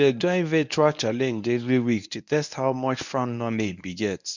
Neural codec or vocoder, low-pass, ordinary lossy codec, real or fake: codec, 24 kHz, 0.9 kbps, WavTokenizer, medium speech release version 1; 7.2 kHz; none; fake